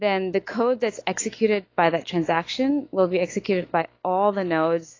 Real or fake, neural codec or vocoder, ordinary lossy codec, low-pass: fake; autoencoder, 48 kHz, 128 numbers a frame, DAC-VAE, trained on Japanese speech; AAC, 32 kbps; 7.2 kHz